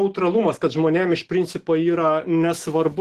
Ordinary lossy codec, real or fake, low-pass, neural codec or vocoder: Opus, 16 kbps; fake; 14.4 kHz; autoencoder, 48 kHz, 128 numbers a frame, DAC-VAE, trained on Japanese speech